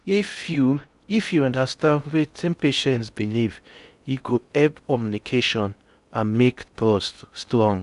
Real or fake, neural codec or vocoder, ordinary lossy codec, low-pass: fake; codec, 16 kHz in and 24 kHz out, 0.6 kbps, FocalCodec, streaming, 2048 codes; none; 10.8 kHz